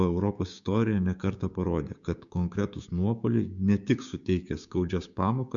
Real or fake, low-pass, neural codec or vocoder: fake; 7.2 kHz; codec, 16 kHz, 6 kbps, DAC